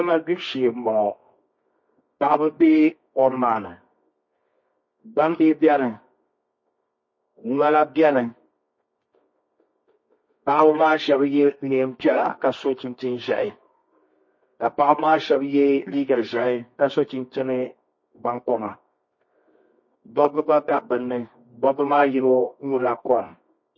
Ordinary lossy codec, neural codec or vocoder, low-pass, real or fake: MP3, 32 kbps; codec, 24 kHz, 0.9 kbps, WavTokenizer, medium music audio release; 7.2 kHz; fake